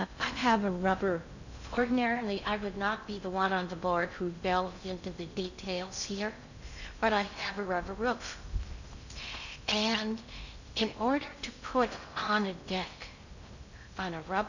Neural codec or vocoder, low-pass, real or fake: codec, 16 kHz in and 24 kHz out, 0.6 kbps, FocalCodec, streaming, 2048 codes; 7.2 kHz; fake